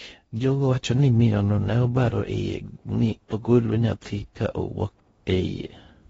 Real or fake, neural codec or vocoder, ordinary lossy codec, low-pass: fake; codec, 16 kHz in and 24 kHz out, 0.6 kbps, FocalCodec, streaming, 2048 codes; AAC, 24 kbps; 10.8 kHz